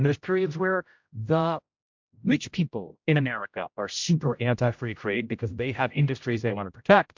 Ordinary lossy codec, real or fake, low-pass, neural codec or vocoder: MP3, 64 kbps; fake; 7.2 kHz; codec, 16 kHz, 0.5 kbps, X-Codec, HuBERT features, trained on general audio